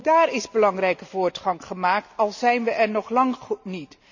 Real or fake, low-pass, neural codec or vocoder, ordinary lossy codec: real; 7.2 kHz; none; none